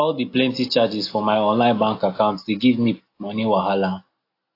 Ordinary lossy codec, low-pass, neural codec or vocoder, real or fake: AAC, 32 kbps; 5.4 kHz; none; real